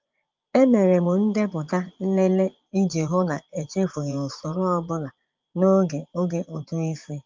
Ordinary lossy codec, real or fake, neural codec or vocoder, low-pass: Opus, 24 kbps; fake; vocoder, 24 kHz, 100 mel bands, Vocos; 7.2 kHz